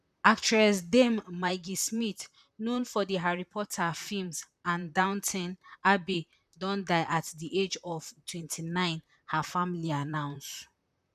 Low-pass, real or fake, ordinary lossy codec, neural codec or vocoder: 14.4 kHz; fake; none; vocoder, 44.1 kHz, 128 mel bands, Pupu-Vocoder